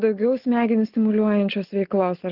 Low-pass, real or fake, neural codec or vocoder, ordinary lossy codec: 5.4 kHz; real; none; Opus, 16 kbps